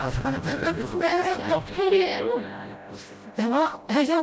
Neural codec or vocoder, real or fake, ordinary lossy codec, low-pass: codec, 16 kHz, 0.5 kbps, FreqCodec, smaller model; fake; none; none